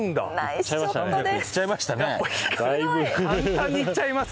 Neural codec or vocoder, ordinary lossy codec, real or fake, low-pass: none; none; real; none